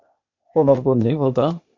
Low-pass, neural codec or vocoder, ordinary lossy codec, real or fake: 7.2 kHz; codec, 16 kHz, 0.8 kbps, ZipCodec; MP3, 48 kbps; fake